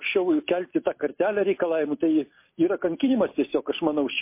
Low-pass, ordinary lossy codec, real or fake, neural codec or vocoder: 3.6 kHz; MP3, 24 kbps; real; none